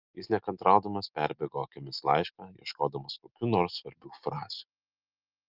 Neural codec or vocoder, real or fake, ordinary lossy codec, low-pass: none; real; Opus, 24 kbps; 5.4 kHz